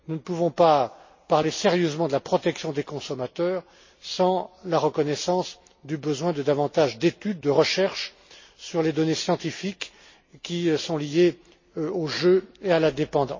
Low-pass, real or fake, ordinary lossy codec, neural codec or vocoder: 7.2 kHz; real; MP3, 32 kbps; none